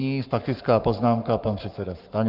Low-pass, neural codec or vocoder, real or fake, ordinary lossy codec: 5.4 kHz; codec, 44.1 kHz, 7.8 kbps, Pupu-Codec; fake; Opus, 24 kbps